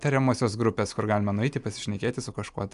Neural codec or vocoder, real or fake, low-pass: none; real; 10.8 kHz